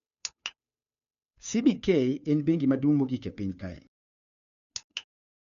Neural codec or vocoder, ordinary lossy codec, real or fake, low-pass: codec, 16 kHz, 2 kbps, FunCodec, trained on Chinese and English, 25 frames a second; none; fake; 7.2 kHz